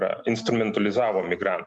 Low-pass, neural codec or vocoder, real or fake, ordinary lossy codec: 10.8 kHz; none; real; MP3, 64 kbps